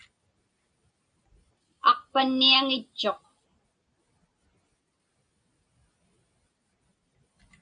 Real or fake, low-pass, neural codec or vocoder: real; 9.9 kHz; none